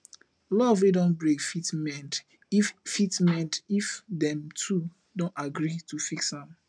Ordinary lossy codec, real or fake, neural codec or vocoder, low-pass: none; real; none; 9.9 kHz